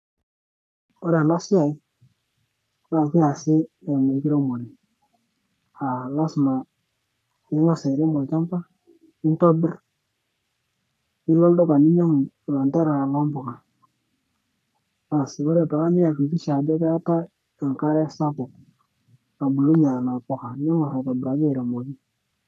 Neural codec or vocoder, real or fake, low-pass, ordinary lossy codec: codec, 44.1 kHz, 3.4 kbps, Pupu-Codec; fake; 14.4 kHz; none